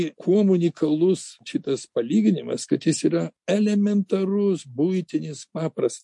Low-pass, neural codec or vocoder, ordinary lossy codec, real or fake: 10.8 kHz; none; MP3, 48 kbps; real